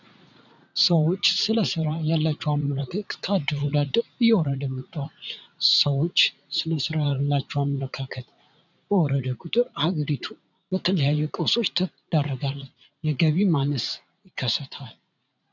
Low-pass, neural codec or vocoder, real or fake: 7.2 kHz; vocoder, 44.1 kHz, 80 mel bands, Vocos; fake